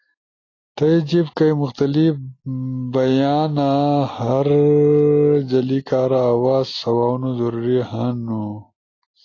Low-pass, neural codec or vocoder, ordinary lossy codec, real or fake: 7.2 kHz; none; AAC, 32 kbps; real